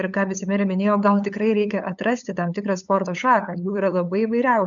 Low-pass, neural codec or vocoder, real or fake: 7.2 kHz; codec, 16 kHz, 8 kbps, FunCodec, trained on LibriTTS, 25 frames a second; fake